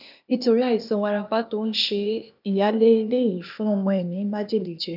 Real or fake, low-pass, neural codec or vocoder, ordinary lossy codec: fake; 5.4 kHz; codec, 16 kHz, 0.8 kbps, ZipCodec; none